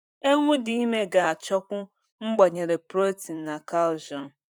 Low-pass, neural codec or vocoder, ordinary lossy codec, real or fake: 19.8 kHz; vocoder, 44.1 kHz, 128 mel bands, Pupu-Vocoder; none; fake